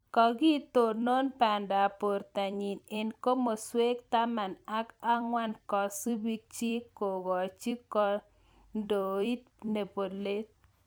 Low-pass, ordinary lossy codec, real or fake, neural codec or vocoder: none; none; fake; vocoder, 44.1 kHz, 128 mel bands every 256 samples, BigVGAN v2